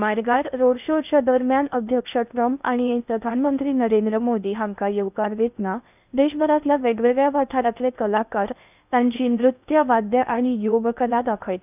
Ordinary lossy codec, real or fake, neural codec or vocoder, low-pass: none; fake; codec, 16 kHz in and 24 kHz out, 0.6 kbps, FocalCodec, streaming, 2048 codes; 3.6 kHz